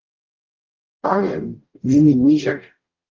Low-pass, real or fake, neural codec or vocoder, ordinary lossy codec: 7.2 kHz; fake; codec, 44.1 kHz, 0.9 kbps, DAC; Opus, 32 kbps